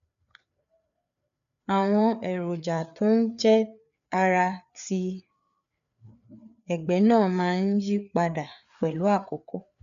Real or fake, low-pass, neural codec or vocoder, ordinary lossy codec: fake; 7.2 kHz; codec, 16 kHz, 4 kbps, FreqCodec, larger model; none